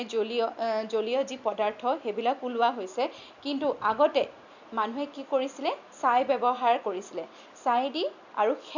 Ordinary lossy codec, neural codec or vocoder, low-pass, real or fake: none; none; 7.2 kHz; real